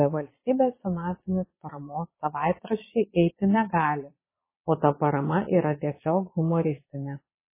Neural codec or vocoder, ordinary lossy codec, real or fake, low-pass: vocoder, 22.05 kHz, 80 mel bands, Vocos; MP3, 16 kbps; fake; 3.6 kHz